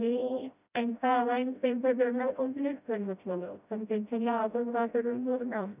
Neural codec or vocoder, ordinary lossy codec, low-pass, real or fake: codec, 16 kHz, 0.5 kbps, FreqCodec, smaller model; none; 3.6 kHz; fake